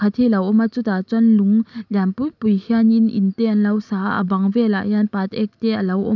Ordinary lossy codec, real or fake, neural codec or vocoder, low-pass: none; real; none; 7.2 kHz